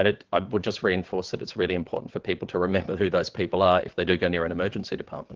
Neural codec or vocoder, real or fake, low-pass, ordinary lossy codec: vocoder, 22.05 kHz, 80 mel bands, WaveNeXt; fake; 7.2 kHz; Opus, 16 kbps